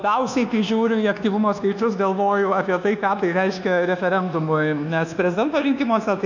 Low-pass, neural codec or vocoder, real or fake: 7.2 kHz; codec, 24 kHz, 1.2 kbps, DualCodec; fake